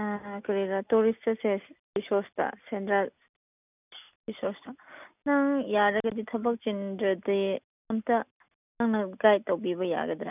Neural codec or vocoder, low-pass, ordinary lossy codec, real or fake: none; 3.6 kHz; none; real